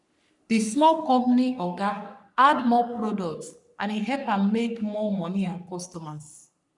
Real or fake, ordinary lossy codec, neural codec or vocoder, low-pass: fake; none; codec, 44.1 kHz, 3.4 kbps, Pupu-Codec; 10.8 kHz